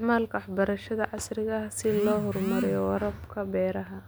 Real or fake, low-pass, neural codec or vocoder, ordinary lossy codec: real; none; none; none